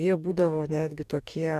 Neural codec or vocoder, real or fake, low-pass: codec, 44.1 kHz, 2.6 kbps, DAC; fake; 14.4 kHz